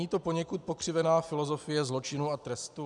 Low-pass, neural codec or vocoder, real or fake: 10.8 kHz; none; real